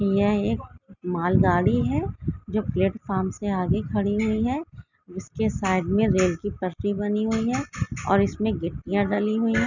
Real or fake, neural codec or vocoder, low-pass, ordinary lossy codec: real; none; 7.2 kHz; none